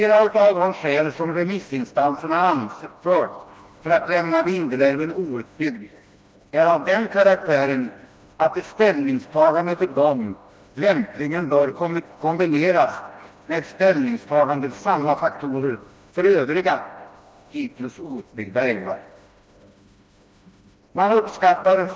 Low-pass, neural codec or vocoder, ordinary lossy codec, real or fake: none; codec, 16 kHz, 1 kbps, FreqCodec, smaller model; none; fake